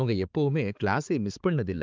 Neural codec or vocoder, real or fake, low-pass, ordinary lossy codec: codec, 16 kHz, 4 kbps, X-Codec, HuBERT features, trained on balanced general audio; fake; 7.2 kHz; Opus, 24 kbps